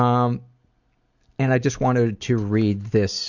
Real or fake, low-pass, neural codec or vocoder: real; 7.2 kHz; none